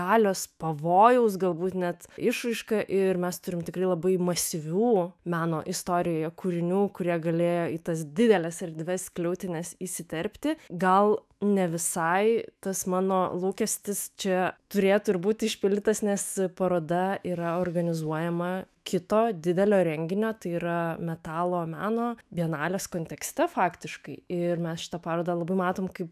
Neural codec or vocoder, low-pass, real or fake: autoencoder, 48 kHz, 128 numbers a frame, DAC-VAE, trained on Japanese speech; 14.4 kHz; fake